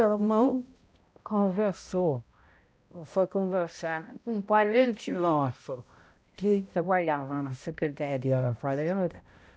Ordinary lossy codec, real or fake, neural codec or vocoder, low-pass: none; fake; codec, 16 kHz, 0.5 kbps, X-Codec, HuBERT features, trained on balanced general audio; none